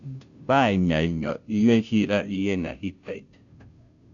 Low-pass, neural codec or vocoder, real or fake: 7.2 kHz; codec, 16 kHz, 0.5 kbps, FunCodec, trained on Chinese and English, 25 frames a second; fake